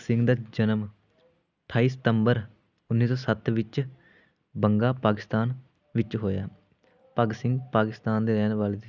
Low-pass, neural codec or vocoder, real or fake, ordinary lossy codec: 7.2 kHz; none; real; none